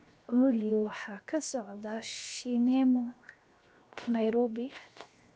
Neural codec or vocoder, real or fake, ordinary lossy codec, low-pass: codec, 16 kHz, 0.7 kbps, FocalCodec; fake; none; none